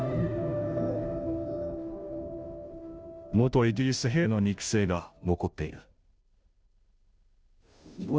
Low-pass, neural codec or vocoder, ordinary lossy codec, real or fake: none; codec, 16 kHz, 0.5 kbps, FunCodec, trained on Chinese and English, 25 frames a second; none; fake